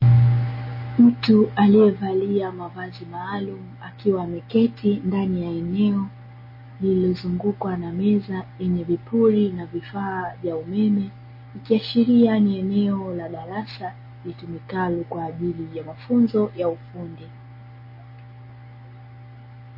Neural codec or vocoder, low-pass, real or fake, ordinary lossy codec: none; 5.4 kHz; real; MP3, 24 kbps